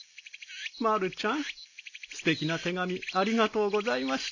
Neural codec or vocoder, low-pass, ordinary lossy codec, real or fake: none; 7.2 kHz; none; real